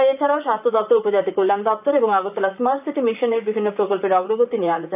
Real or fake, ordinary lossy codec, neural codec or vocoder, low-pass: fake; none; vocoder, 44.1 kHz, 128 mel bands, Pupu-Vocoder; 3.6 kHz